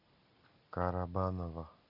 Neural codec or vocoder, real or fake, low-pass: none; real; 5.4 kHz